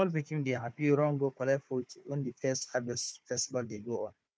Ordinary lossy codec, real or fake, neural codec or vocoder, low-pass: none; fake; codec, 16 kHz, 4 kbps, FunCodec, trained on Chinese and English, 50 frames a second; none